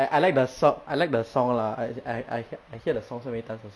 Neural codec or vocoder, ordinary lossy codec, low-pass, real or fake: none; none; none; real